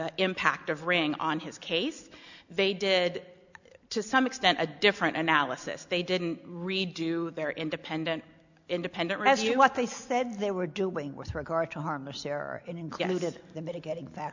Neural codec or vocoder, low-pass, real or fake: none; 7.2 kHz; real